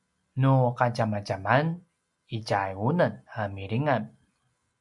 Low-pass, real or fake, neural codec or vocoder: 10.8 kHz; real; none